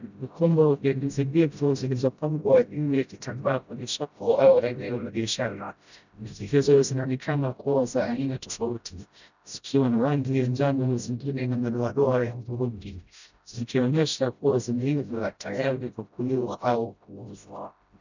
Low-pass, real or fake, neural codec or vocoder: 7.2 kHz; fake; codec, 16 kHz, 0.5 kbps, FreqCodec, smaller model